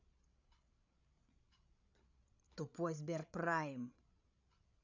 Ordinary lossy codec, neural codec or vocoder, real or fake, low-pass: none; codec, 16 kHz, 8 kbps, FreqCodec, larger model; fake; 7.2 kHz